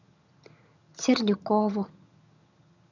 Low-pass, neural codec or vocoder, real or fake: 7.2 kHz; vocoder, 22.05 kHz, 80 mel bands, HiFi-GAN; fake